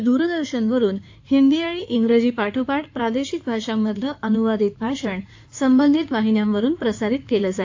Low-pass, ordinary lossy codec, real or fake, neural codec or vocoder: 7.2 kHz; AAC, 48 kbps; fake; codec, 16 kHz in and 24 kHz out, 2.2 kbps, FireRedTTS-2 codec